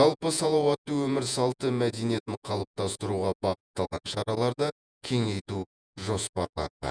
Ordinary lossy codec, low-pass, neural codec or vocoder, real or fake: none; 9.9 kHz; vocoder, 48 kHz, 128 mel bands, Vocos; fake